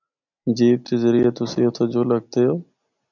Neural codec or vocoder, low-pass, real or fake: none; 7.2 kHz; real